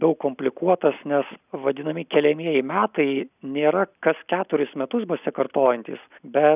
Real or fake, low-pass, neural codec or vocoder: real; 3.6 kHz; none